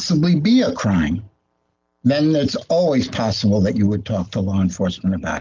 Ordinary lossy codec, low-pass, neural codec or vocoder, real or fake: Opus, 24 kbps; 7.2 kHz; none; real